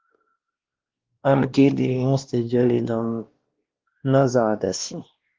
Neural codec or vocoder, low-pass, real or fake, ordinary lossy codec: codec, 16 kHz, 1 kbps, X-Codec, WavLM features, trained on Multilingual LibriSpeech; 7.2 kHz; fake; Opus, 16 kbps